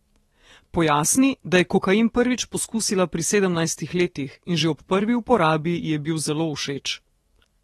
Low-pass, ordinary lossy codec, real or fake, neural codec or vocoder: 19.8 kHz; AAC, 32 kbps; fake; autoencoder, 48 kHz, 128 numbers a frame, DAC-VAE, trained on Japanese speech